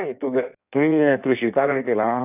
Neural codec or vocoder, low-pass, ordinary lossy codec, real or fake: codec, 16 kHz in and 24 kHz out, 1.1 kbps, FireRedTTS-2 codec; 3.6 kHz; none; fake